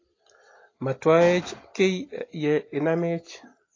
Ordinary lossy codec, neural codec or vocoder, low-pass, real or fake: AAC, 32 kbps; none; 7.2 kHz; real